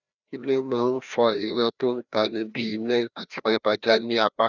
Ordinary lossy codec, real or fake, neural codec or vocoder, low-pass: none; fake; codec, 16 kHz, 1 kbps, FreqCodec, larger model; 7.2 kHz